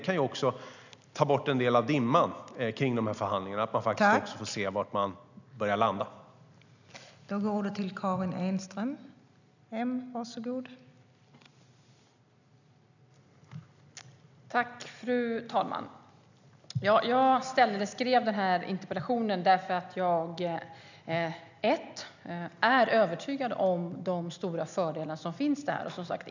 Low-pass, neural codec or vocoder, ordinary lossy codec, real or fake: 7.2 kHz; none; none; real